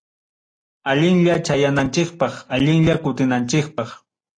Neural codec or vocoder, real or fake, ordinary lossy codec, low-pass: none; real; AAC, 32 kbps; 9.9 kHz